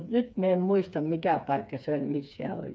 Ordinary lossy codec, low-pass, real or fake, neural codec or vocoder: none; none; fake; codec, 16 kHz, 4 kbps, FreqCodec, smaller model